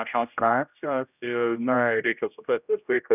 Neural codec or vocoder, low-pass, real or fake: codec, 16 kHz, 0.5 kbps, X-Codec, HuBERT features, trained on general audio; 3.6 kHz; fake